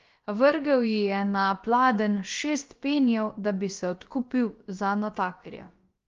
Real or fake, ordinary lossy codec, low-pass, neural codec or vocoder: fake; Opus, 32 kbps; 7.2 kHz; codec, 16 kHz, about 1 kbps, DyCAST, with the encoder's durations